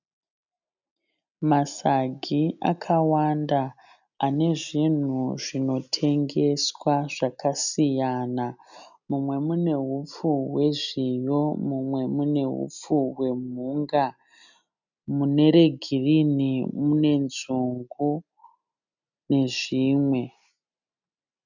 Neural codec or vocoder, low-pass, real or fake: none; 7.2 kHz; real